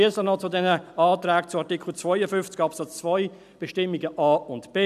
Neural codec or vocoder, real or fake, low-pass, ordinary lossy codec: none; real; 14.4 kHz; none